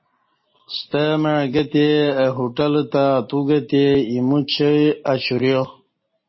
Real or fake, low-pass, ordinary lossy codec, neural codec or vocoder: real; 7.2 kHz; MP3, 24 kbps; none